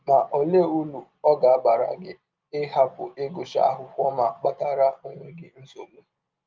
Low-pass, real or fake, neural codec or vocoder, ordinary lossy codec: 7.2 kHz; real; none; Opus, 32 kbps